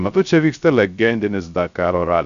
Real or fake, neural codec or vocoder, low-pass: fake; codec, 16 kHz, 0.3 kbps, FocalCodec; 7.2 kHz